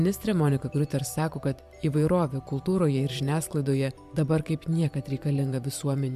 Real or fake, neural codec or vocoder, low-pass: fake; vocoder, 44.1 kHz, 128 mel bands every 512 samples, BigVGAN v2; 14.4 kHz